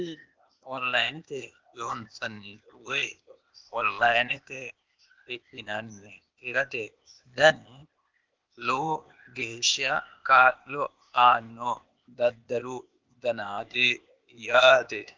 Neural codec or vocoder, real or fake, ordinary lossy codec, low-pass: codec, 16 kHz, 0.8 kbps, ZipCodec; fake; Opus, 24 kbps; 7.2 kHz